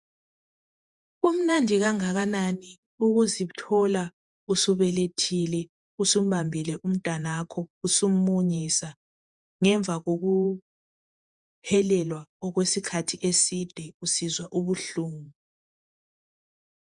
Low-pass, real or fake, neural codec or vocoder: 10.8 kHz; fake; vocoder, 48 kHz, 128 mel bands, Vocos